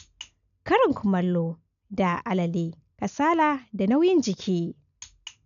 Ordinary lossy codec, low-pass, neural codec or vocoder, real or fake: none; 7.2 kHz; none; real